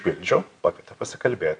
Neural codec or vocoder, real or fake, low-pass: none; real; 9.9 kHz